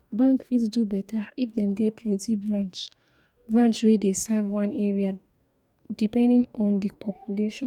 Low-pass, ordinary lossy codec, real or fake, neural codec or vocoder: 19.8 kHz; none; fake; codec, 44.1 kHz, 2.6 kbps, DAC